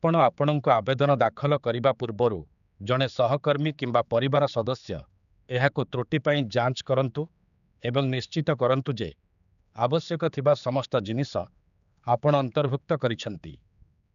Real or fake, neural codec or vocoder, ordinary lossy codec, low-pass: fake; codec, 16 kHz, 4 kbps, X-Codec, HuBERT features, trained on general audio; none; 7.2 kHz